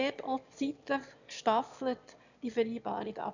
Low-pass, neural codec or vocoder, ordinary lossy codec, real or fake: 7.2 kHz; autoencoder, 22.05 kHz, a latent of 192 numbers a frame, VITS, trained on one speaker; none; fake